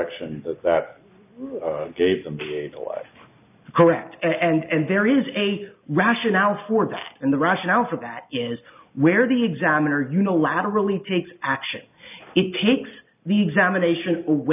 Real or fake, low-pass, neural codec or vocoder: real; 3.6 kHz; none